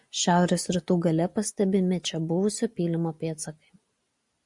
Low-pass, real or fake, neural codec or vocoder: 10.8 kHz; real; none